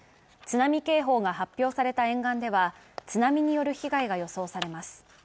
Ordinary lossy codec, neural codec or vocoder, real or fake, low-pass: none; none; real; none